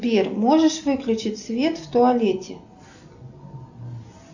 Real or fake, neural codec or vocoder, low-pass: real; none; 7.2 kHz